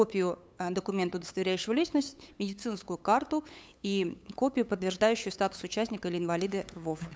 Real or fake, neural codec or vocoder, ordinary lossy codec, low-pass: fake; codec, 16 kHz, 8 kbps, FunCodec, trained on LibriTTS, 25 frames a second; none; none